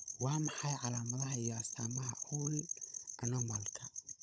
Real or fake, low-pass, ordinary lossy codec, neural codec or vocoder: fake; none; none; codec, 16 kHz, 16 kbps, FunCodec, trained on Chinese and English, 50 frames a second